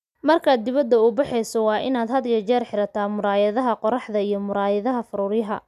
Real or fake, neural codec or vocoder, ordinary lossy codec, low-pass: real; none; none; 14.4 kHz